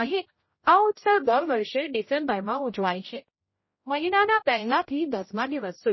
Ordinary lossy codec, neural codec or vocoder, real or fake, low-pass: MP3, 24 kbps; codec, 16 kHz, 0.5 kbps, X-Codec, HuBERT features, trained on general audio; fake; 7.2 kHz